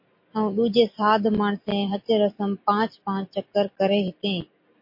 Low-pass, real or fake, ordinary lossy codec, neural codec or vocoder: 5.4 kHz; real; MP3, 32 kbps; none